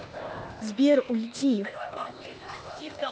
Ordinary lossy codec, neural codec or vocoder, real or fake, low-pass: none; codec, 16 kHz, 0.8 kbps, ZipCodec; fake; none